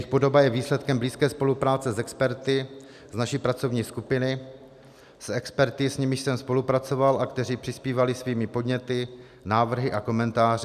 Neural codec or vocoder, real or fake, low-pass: none; real; 14.4 kHz